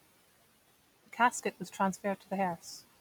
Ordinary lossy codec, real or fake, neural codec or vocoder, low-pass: none; real; none; none